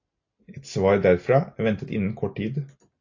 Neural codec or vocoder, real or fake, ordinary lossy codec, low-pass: none; real; AAC, 48 kbps; 7.2 kHz